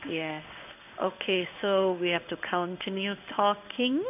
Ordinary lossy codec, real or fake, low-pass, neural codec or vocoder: none; fake; 3.6 kHz; codec, 16 kHz in and 24 kHz out, 1 kbps, XY-Tokenizer